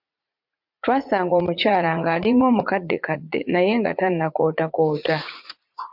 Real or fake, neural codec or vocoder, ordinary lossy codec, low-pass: fake; vocoder, 44.1 kHz, 80 mel bands, Vocos; MP3, 48 kbps; 5.4 kHz